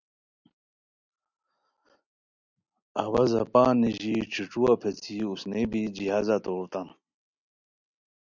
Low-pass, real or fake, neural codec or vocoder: 7.2 kHz; real; none